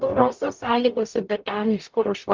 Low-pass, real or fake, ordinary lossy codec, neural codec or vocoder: 7.2 kHz; fake; Opus, 24 kbps; codec, 44.1 kHz, 0.9 kbps, DAC